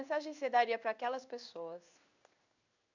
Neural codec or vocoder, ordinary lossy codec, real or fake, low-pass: codec, 16 kHz in and 24 kHz out, 1 kbps, XY-Tokenizer; none; fake; 7.2 kHz